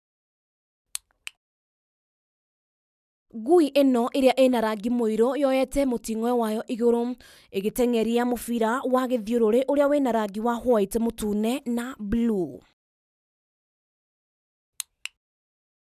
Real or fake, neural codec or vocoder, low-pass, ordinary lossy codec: real; none; 14.4 kHz; none